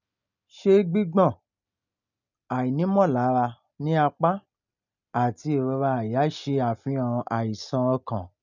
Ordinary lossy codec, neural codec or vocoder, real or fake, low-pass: none; none; real; 7.2 kHz